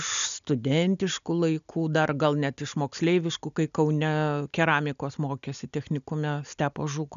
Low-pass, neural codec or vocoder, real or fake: 7.2 kHz; none; real